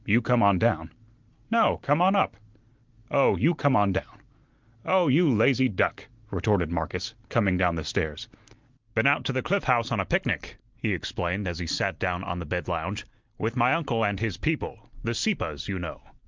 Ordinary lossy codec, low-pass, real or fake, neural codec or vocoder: Opus, 32 kbps; 7.2 kHz; real; none